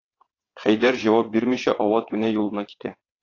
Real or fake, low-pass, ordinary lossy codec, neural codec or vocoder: fake; 7.2 kHz; AAC, 32 kbps; vocoder, 22.05 kHz, 80 mel bands, WaveNeXt